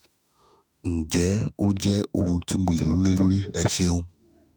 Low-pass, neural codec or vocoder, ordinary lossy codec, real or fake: none; autoencoder, 48 kHz, 32 numbers a frame, DAC-VAE, trained on Japanese speech; none; fake